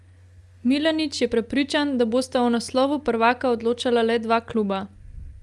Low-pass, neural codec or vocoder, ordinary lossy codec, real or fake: 10.8 kHz; none; Opus, 32 kbps; real